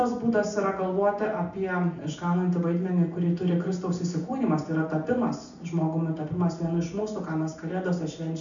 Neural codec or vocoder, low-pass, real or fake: none; 7.2 kHz; real